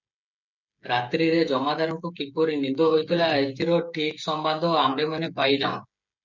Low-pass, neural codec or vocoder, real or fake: 7.2 kHz; codec, 16 kHz, 16 kbps, FreqCodec, smaller model; fake